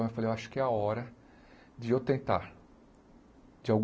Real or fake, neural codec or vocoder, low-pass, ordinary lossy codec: real; none; none; none